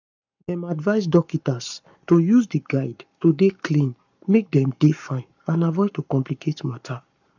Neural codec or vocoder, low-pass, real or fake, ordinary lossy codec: codec, 44.1 kHz, 7.8 kbps, Pupu-Codec; 7.2 kHz; fake; none